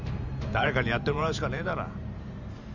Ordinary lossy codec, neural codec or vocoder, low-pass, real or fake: none; vocoder, 44.1 kHz, 128 mel bands every 512 samples, BigVGAN v2; 7.2 kHz; fake